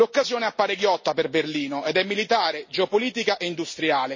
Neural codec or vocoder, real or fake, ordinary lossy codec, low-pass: none; real; MP3, 32 kbps; 7.2 kHz